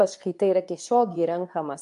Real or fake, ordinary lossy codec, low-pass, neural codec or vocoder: fake; AAC, 64 kbps; 10.8 kHz; codec, 24 kHz, 0.9 kbps, WavTokenizer, medium speech release version 2